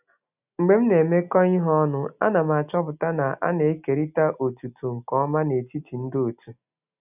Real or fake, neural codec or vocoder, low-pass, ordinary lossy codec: real; none; 3.6 kHz; none